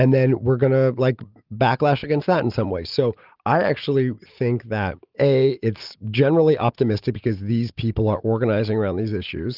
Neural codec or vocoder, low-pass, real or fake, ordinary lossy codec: none; 5.4 kHz; real; Opus, 32 kbps